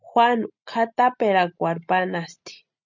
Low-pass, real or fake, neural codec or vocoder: 7.2 kHz; real; none